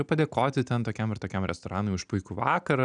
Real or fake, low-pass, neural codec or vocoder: real; 9.9 kHz; none